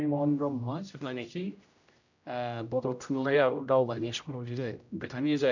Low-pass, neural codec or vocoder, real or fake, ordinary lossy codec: 7.2 kHz; codec, 16 kHz, 0.5 kbps, X-Codec, HuBERT features, trained on general audio; fake; none